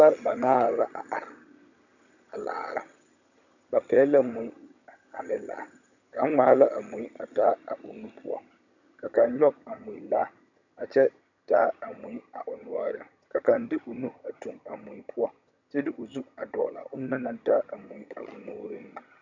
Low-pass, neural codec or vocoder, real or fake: 7.2 kHz; vocoder, 22.05 kHz, 80 mel bands, HiFi-GAN; fake